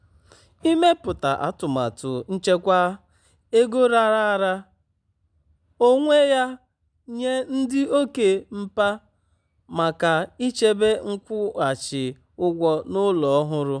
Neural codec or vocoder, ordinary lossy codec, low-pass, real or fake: none; none; 9.9 kHz; real